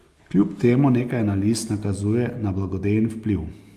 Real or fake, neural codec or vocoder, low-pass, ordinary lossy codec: real; none; 14.4 kHz; Opus, 32 kbps